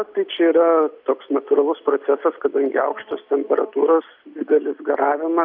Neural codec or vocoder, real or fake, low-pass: none; real; 5.4 kHz